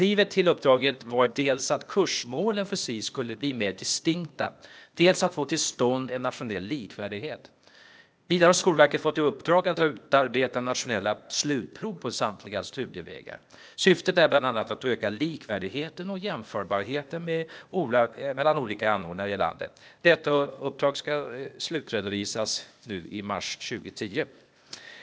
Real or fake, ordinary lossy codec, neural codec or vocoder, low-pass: fake; none; codec, 16 kHz, 0.8 kbps, ZipCodec; none